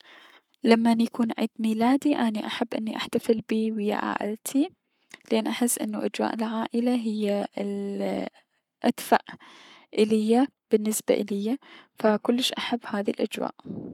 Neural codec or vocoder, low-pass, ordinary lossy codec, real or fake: codec, 44.1 kHz, 7.8 kbps, Pupu-Codec; 19.8 kHz; none; fake